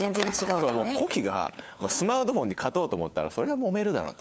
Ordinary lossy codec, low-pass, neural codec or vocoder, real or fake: none; none; codec, 16 kHz, 4 kbps, FunCodec, trained on LibriTTS, 50 frames a second; fake